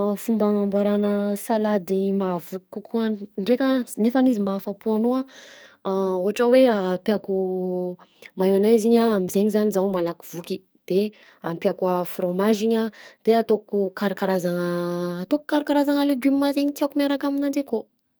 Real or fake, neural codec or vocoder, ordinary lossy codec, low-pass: fake; codec, 44.1 kHz, 2.6 kbps, SNAC; none; none